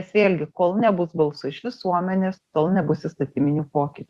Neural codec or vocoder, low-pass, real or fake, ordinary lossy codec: vocoder, 44.1 kHz, 128 mel bands every 256 samples, BigVGAN v2; 14.4 kHz; fake; Opus, 64 kbps